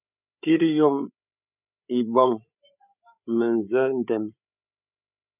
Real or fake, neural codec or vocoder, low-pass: fake; codec, 16 kHz, 8 kbps, FreqCodec, larger model; 3.6 kHz